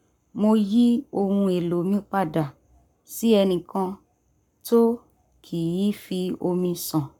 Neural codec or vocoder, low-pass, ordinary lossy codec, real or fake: codec, 44.1 kHz, 7.8 kbps, Pupu-Codec; 19.8 kHz; none; fake